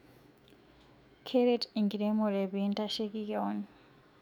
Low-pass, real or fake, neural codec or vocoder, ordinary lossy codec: 19.8 kHz; fake; autoencoder, 48 kHz, 128 numbers a frame, DAC-VAE, trained on Japanese speech; none